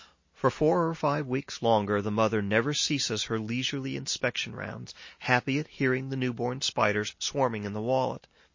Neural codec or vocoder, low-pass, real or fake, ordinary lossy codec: none; 7.2 kHz; real; MP3, 32 kbps